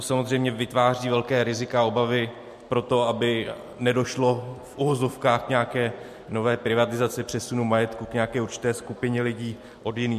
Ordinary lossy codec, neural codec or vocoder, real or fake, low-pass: MP3, 64 kbps; none; real; 14.4 kHz